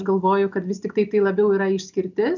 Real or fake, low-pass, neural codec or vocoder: real; 7.2 kHz; none